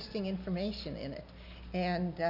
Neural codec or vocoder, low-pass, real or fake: none; 5.4 kHz; real